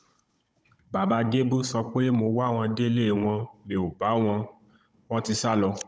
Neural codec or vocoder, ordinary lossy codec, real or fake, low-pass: codec, 16 kHz, 16 kbps, FunCodec, trained on Chinese and English, 50 frames a second; none; fake; none